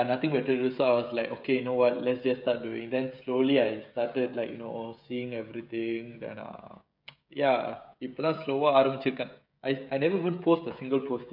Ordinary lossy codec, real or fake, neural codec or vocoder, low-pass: none; fake; codec, 16 kHz, 16 kbps, FreqCodec, smaller model; 5.4 kHz